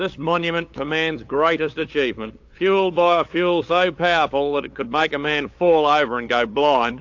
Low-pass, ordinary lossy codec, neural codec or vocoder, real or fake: 7.2 kHz; AAC, 48 kbps; codec, 16 kHz, 8 kbps, FunCodec, trained on LibriTTS, 25 frames a second; fake